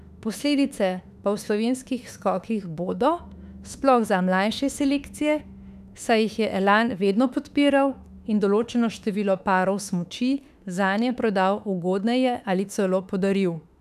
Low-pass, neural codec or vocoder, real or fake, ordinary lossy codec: 14.4 kHz; autoencoder, 48 kHz, 32 numbers a frame, DAC-VAE, trained on Japanese speech; fake; none